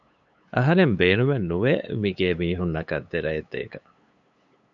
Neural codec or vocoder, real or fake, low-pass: codec, 16 kHz, 8 kbps, FunCodec, trained on LibriTTS, 25 frames a second; fake; 7.2 kHz